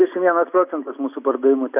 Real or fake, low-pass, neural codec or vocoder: real; 3.6 kHz; none